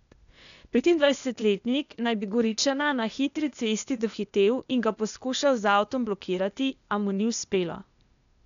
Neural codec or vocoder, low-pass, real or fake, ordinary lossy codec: codec, 16 kHz, 0.8 kbps, ZipCodec; 7.2 kHz; fake; none